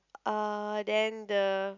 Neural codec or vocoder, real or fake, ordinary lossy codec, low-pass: none; real; none; 7.2 kHz